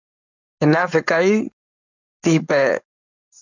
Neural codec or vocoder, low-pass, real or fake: codec, 16 kHz, 4.8 kbps, FACodec; 7.2 kHz; fake